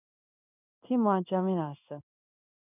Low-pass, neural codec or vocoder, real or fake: 3.6 kHz; codec, 16 kHz in and 24 kHz out, 1 kbps, XY-Tokenizer; fake